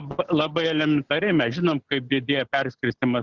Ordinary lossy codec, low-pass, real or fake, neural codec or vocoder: Opus, 64 kbps; 7.2 kHz; real; none